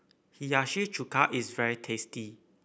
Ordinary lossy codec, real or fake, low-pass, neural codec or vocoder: none; real; none; none